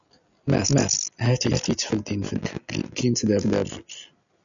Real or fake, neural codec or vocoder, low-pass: real; none; 7.2 kHz